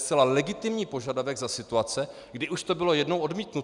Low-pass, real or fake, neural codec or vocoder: 10.8 kHz; real; none